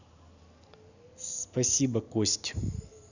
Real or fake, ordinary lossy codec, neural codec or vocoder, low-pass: real; none; none; 7.2 kHz